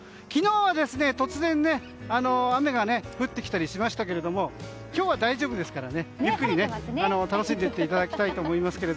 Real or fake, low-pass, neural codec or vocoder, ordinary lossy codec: real; none; none; none